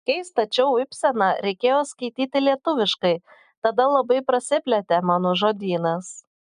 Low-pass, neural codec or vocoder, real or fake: 10.8 kHz; none; real